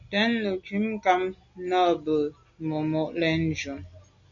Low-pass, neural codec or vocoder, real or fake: 7.2 kHz; none; real